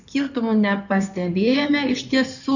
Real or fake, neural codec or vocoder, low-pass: fake; codec, 16 kHz in and 24 kHz out, 2.2 kbps, FireRedTTS-2 codec; 7.2 kHz